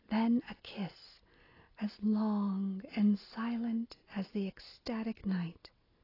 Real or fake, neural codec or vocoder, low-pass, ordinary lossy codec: real; none; 5.4 kHz; AAC, 24 kbps